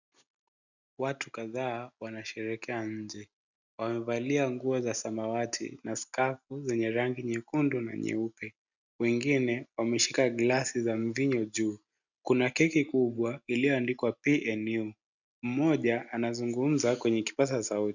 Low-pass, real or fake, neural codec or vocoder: 7.2 kHz; real; none